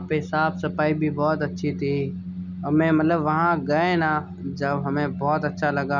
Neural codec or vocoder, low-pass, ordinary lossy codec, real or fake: none; 7.2 kHz; none; real